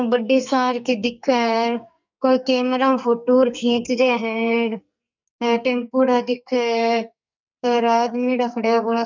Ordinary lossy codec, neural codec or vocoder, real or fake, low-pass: none; codec, 44.1 kHz, 2.6 kbps, SNAC; fake; 7.2 kHz